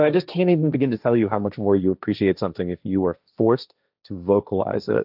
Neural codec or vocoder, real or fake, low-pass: codec, 16 kHz, 1.1 kbps, Voila-Tokenizer; fake; 5.4 kHz